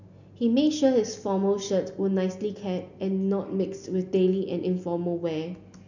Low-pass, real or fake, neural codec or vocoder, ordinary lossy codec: 7.2 kHz; real; none; none